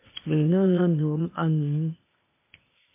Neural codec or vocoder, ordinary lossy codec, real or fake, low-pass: codec, 16 kHz, 0.8 kbps, ZipCodec; MP3, 24 kbps; fake; 3.6 kHz